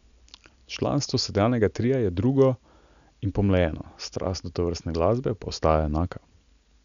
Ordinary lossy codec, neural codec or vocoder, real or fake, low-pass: none; none; real; 7.2 kHz